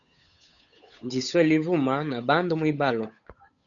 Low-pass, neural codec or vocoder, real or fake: 7.2 kHz; codec, 16 kHz, 8 kbps, FunCodec, trained on Chinese and English, 25 frames a second; fake